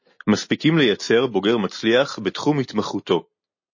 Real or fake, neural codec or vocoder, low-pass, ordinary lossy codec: real; none; 7.2 kHz; MP3, 32 kbps